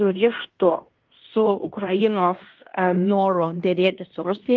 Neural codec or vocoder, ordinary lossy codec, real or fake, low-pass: codec, 16 kHz in and 24 kHz out, 0.9 kbps, LongCat-Audio-Codec, fine tuned four codebook decoder; Opus, 32 kbps; fake; 7.2 kHz